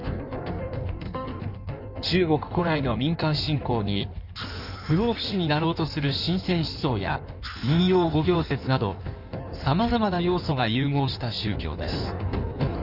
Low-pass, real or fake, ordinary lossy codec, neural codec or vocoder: 5.4 kHz; fake; none; codec, 16 kHz in and 24 kHz out, 1.1 kbps, FireRedTTS-2 codec